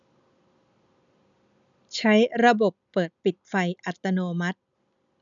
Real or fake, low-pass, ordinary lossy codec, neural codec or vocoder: real; 7.2 kHz; none; none